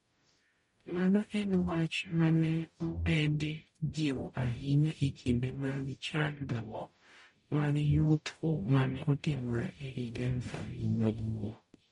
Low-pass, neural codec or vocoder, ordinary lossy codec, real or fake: 19.8 kHz; codec, 44.1 kHz, 0.9 kbps, DAC; MP3, 48 kbps; fake